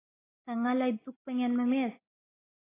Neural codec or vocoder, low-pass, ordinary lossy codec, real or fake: none; 3.6 kHz; AAC, 16 kbps; real